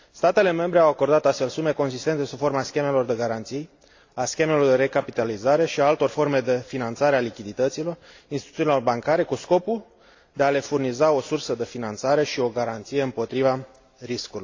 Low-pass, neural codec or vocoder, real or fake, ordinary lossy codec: 7.2 kHz; none; real; AAC, 48 kbps